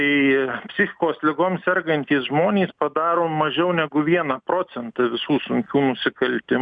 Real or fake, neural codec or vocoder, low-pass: real; none; 9.9 kHz